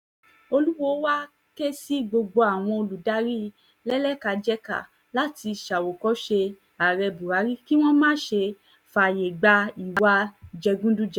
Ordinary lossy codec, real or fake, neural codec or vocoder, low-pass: none; real; none; 19.8 kHz